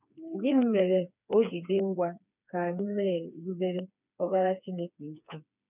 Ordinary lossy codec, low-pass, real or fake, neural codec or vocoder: none; 3.6 kHz; fake; codec, 16 kHz, 4 kbps, FreqCodec, smaller model